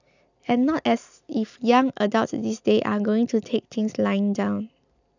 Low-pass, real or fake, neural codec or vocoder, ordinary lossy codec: 7.2 kHz; real; none; none